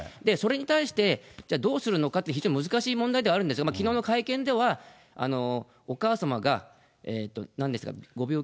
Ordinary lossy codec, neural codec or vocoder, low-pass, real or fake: none; none; none; real